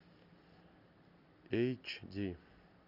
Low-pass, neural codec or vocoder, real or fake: 5.4 kHz; none; real